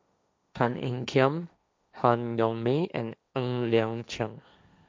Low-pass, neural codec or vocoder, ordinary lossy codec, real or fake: none; codec, 16 kHz, 1.1 kbps, Voila-Tokenizer; none; fake